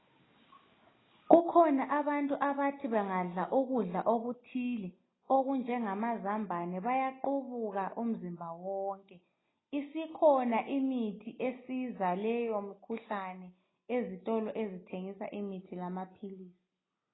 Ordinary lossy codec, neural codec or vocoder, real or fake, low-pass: AAC, 16 kbps; none; real; 7.2 kHz